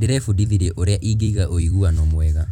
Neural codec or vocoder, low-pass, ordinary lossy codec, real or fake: vocoder, 44.1 kHz, 128 mel bands every 256 samples, BigVGAN v2; 19.8 kHz; none; fake